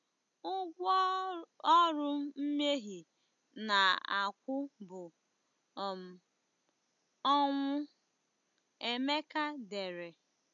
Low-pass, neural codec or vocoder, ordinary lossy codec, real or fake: 7.2 kHz; none; MP3, 64 kbps; real